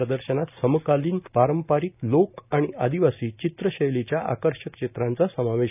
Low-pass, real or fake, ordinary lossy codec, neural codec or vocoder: 3.6 kHz; real; none; none